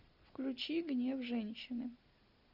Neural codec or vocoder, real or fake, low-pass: none; real; 5.4 kHz